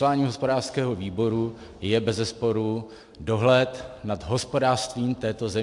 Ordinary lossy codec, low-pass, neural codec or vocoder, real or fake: MP3, 64 kbps; 10.8 kHz; none; real